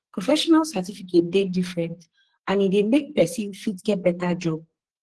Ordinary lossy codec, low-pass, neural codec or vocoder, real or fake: Opus, 16 kbps; 10.8 kHz; codec, 44.1 kHz, 2.6 kbps, SNAC; fake